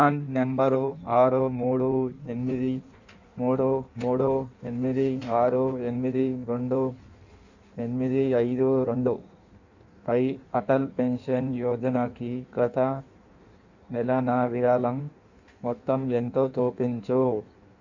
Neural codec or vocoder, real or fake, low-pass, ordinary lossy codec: codec, 16 kHz in and 24 kHz out, 1.1 kbps, FireRedTTS-2 codec; fake; 7.2 kHz; none